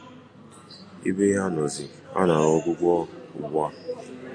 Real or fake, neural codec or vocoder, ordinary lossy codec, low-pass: real; none; AAC, 64 kbps; 9.9 kHz